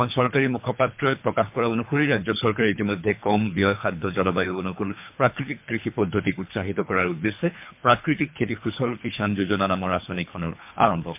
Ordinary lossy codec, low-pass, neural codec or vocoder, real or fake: MP3, 32 kbps; 3.6 kHz; codec, 24 kHz, 3 kbps, HILCodec; fake